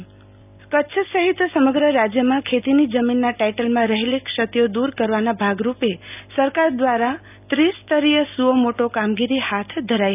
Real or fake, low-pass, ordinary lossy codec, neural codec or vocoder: real; 3.6 kHz; none; none